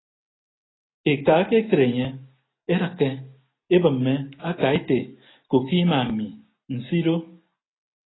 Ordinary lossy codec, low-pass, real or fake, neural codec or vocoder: AAC, 16 kbps; 7.2 kHz; real; none